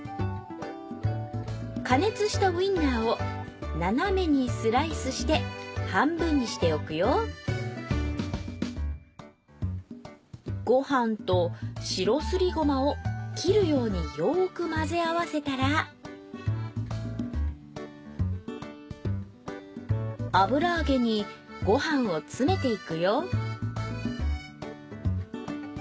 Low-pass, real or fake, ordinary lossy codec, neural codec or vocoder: none; real; none; none